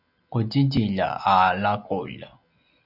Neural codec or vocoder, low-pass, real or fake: none; 5.4 kHz; real